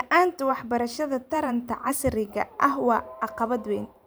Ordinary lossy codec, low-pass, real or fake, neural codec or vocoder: none; none; fake; vocoder, 44.1 kHz, 128 mel bands every 512 samples, BigVGAN v2